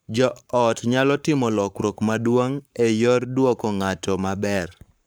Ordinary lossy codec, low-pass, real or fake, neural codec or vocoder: none; none; fake; codec, 44.1 kHz, 7.8 kbps, Pupu-Codec